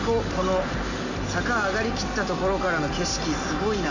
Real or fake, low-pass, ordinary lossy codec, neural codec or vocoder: real; 7.2 kHz; none; none